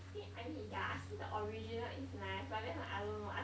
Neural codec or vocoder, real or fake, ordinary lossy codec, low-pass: none; real; none; none